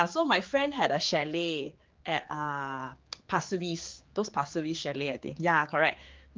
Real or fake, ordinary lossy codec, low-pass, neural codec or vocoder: fake; Opus, 24 kbps; 7.2 kHz; codec, 16 kHz, 4 kbps, X-Codec, HuBERT features, trained on general audio